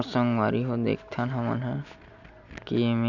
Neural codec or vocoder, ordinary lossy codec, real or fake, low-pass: none; none; real; 7.2 kHz